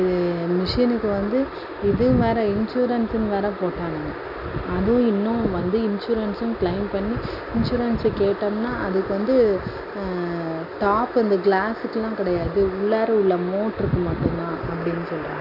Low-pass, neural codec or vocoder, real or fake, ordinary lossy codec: 5.4 kHz; none; real; none